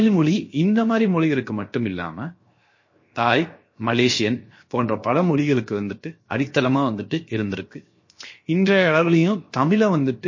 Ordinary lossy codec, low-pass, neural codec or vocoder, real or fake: MP3, 32 kbps; 7.2 kHz; codec, 16 kHz, 0.7 kbps, FocalCodec; fake